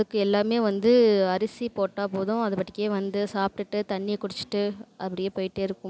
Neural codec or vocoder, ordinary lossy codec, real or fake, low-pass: none; none; real; none